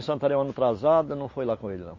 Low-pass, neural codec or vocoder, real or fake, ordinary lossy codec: 7.2 kHz; none; real; MP3, 32 kbps